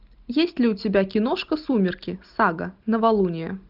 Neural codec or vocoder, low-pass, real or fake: none; 5.4 kHz; real